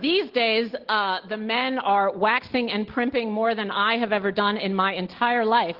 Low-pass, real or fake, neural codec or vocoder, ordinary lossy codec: 5.4 kHz; real; none; Opus, 16 kbps